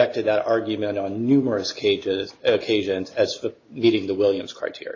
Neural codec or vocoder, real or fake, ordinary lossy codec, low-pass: none; real; AAC, 32 kbps; 7.2 kHz